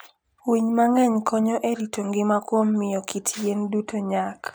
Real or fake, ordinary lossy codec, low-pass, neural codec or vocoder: real; none; none; none